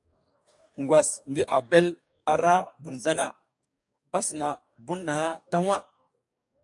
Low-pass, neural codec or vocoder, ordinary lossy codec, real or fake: 10.8 kHz; codec, 44.1 kHz, 2.6 kbps, DAC; MP3, 96 kbps; fake